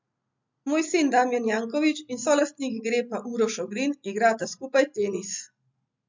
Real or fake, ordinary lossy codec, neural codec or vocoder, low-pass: real; MP3, 64 kbps; none; 7.2 kHz